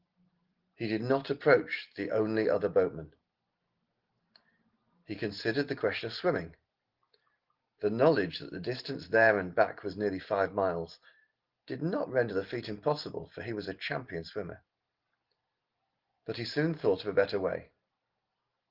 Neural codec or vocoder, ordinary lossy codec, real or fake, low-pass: none; Opus, 16 kbps; real; 5.4 kHz